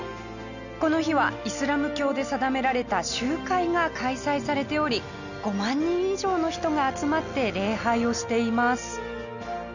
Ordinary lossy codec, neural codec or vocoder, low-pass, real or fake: none; none; 7.2 kHz; real